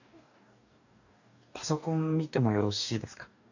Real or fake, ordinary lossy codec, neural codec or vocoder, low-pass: fake; none; codec, 44.1 kHz, 2.6 kbps, DAC; 7.2 kHz